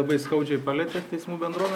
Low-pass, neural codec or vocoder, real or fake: 19.8 kHz; none; real